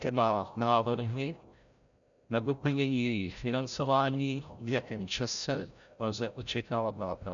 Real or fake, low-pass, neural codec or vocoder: fake; 7.2 kHz; codec, 16 kHz, 0.5 kbps, FreqCodec, larger model